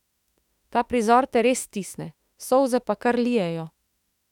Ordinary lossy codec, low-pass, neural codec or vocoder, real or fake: none; 19.8 kHz; autoencoder, 48 kHz, 32 numbers a frame, DAC-VAE, trained on Japanese speech; fake